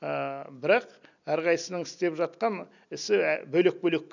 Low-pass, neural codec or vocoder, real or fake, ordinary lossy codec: 7.2 kHz; none; real; none